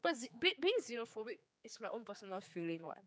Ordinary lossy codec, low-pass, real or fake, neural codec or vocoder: none; none; fake; codec, 16 kHz, 4 kbps, X-Codec, HuBERT features, trained on general audio